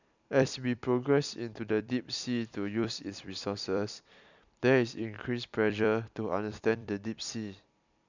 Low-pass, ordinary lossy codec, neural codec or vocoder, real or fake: 7.2 kHz; none; vocoder, 44.1 kHz, 128 mel bands every 256 samples, BigVGAN v2; fake